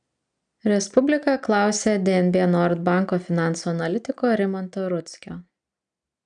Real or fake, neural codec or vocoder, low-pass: real; none; 9.9 kHz